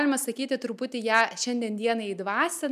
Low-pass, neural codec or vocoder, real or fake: 14.4 kHz; none; real